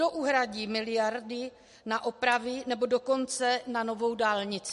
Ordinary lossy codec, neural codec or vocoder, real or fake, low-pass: MP3, 48 kbps; none; real; 14.4 kHz